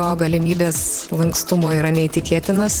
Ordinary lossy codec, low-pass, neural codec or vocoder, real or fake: Opus, 16 kbps; 19.8 kHz; vocoder, 44.1 kHz, 128 mel bands, Pupu-Vocoder; fake